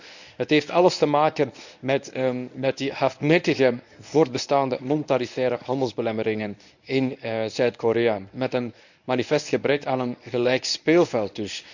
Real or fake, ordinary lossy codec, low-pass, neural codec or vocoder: fake; none; 7.2 kHz; codec, 24 kHz, 0.9 kbps, WavTokenizer, medium speech release version 1